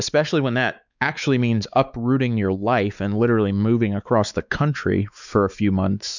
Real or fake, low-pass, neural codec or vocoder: fake; 7.2 kHz; codec, 16 kHz, 4 kbps, X-Codec, WavLM features, trained on Multilingual LibriSpeech